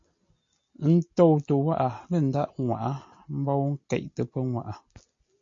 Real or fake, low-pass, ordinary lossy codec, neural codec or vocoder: real; 7.2 kHz; MP3, 64 kbps; none